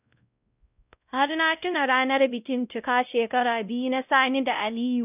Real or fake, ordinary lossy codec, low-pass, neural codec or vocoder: fake; none; 3.6 kHz; codec, 16 kHz, 0.5 kbps, X-Codec, WavLM features, trained on Multilingual LibriSpeech